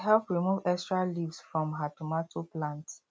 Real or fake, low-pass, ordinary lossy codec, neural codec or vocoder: real; none; none; none